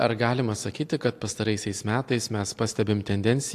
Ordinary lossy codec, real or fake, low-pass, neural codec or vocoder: AAC, 64 kbps; real; 14.4 kHz; none